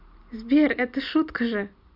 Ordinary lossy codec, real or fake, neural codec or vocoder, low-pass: MP3, 48 kbps; real; none; 5.4 kHz